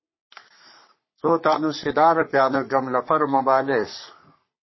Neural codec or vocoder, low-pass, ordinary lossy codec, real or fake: codec, 44.1 kHz, 3.4 kbps, Pupu-Codec; 7.2 kHz; MP3, 24 kbps; fake